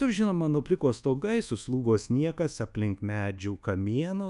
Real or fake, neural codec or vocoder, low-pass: fake; codec, 24 kHz, 1.2 kbps, DualCodec; 10.8 kHz